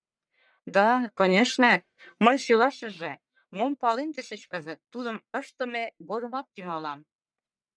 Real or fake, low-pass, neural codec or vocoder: fake; 9.9 kHz; codec, 44.1 kHz, 1.7 kbps, Pupu-Codec